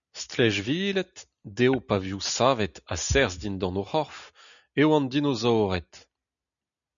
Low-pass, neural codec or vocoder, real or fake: 7.2 kHz; none; real